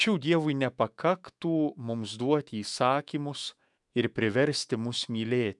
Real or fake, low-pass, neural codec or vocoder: fake; 10.8 kHz; autoencoder, 48 kHz, 128 numbers a frame, DAC-VAE, trained on Japanese speech